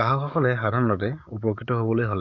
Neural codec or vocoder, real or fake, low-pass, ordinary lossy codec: codec, 44.1 kHz, 7.8 kbps, DAC; fake; 7.2 kHz; none